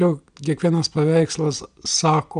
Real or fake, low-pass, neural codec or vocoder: real; 9.9 kHz; none